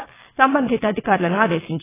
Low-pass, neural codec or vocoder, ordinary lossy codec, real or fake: 3.6 kHz; vocoder, 22.05 kHz, 80 mel bands, WaveNeXt; AAC, 16 kbps; fake